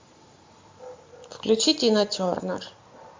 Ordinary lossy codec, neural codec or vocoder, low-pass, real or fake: MP3, 48 kbps; none; 7.2 kHz; real